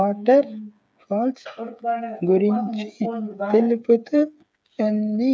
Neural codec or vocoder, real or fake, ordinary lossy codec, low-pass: codec, 16 kHz, 8 kbps, FreqCodec, smaller model; fake; none; none